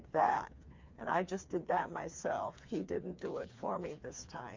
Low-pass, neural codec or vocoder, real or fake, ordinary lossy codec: 7.2 kHz; codec, 16 kHz, 4 kbps, FreqCodec, smaller model; fake; MP3, 48 kbps